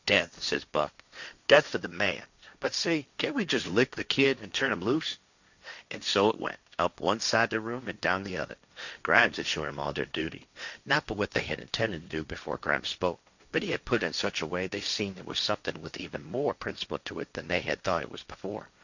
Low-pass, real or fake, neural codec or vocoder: 7.2 kHz; fake; codec, 16 kHz, 1.1 kbps, Voila-Tokenizer